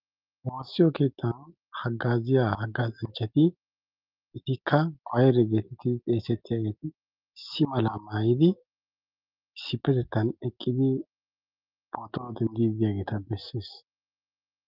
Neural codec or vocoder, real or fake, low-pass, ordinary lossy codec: none; real; 5.4 kHz; Opus, 32 kbps